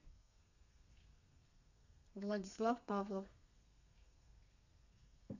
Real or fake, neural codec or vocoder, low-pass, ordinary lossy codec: fake; codec, 32 kHz, 1.9 kbps, SNAC; 7.2 kHz; none